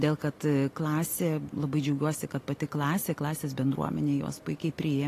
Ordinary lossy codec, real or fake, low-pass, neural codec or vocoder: AAC, 48 kbps; real; 14.4 kHz; none